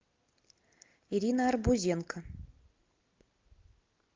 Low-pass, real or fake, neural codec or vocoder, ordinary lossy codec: 7.2 kHz; real; none; Opus, 32 kbps